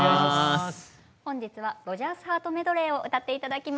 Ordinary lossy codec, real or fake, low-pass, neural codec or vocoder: none; real; none; none